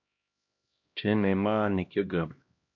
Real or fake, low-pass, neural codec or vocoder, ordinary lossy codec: fake; 7.2 kHz; codec, 16 kHz, 1 kbps, X-Codec, HuBERT features, trained on LibriSpeech; MP3, 48 kbps